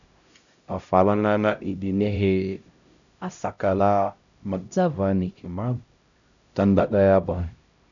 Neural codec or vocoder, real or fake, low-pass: codec, 16 kHz, 0.5 kbps, X-Codec, HuBERT features, trained on LibriSpeech; fake; 7.2 kHz